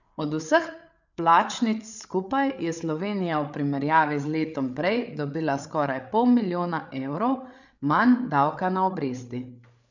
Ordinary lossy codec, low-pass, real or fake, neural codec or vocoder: none; 7.2 kHz; fake; codec, 16 kHz, 8 kbps, FreqCodec, larger model